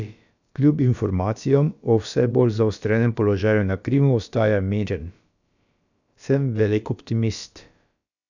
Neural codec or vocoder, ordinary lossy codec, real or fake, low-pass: codec, 16 kHz, about 1 kbps, DyCAST, with the encoder's durations; none; fake; 7.2 kHz